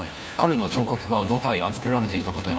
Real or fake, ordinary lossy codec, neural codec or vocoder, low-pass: fake; none; codec, 16 kHz, 1 kbps, FunCodec, trained on LibriTTS, 50 frames a second; none